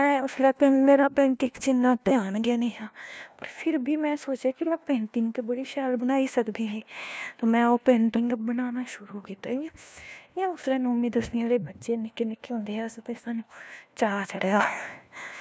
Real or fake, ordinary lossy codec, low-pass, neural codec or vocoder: fake; none; none; codec, 16 kHz, 1 kbps, FunCodec, trained on LibriTTS, 50 frames a second